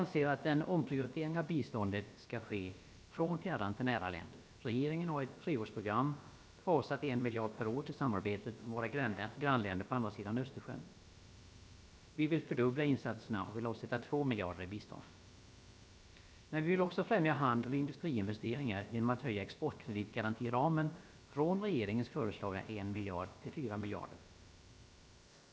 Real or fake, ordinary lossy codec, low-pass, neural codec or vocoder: fake; none; none; codec, 16 kHz, about 1 kbps, DyCAST, with the encoder's durations